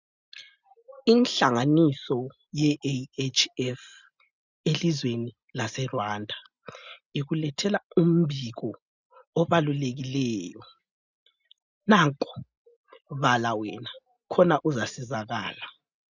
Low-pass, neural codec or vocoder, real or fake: 7.2 kHz; none; real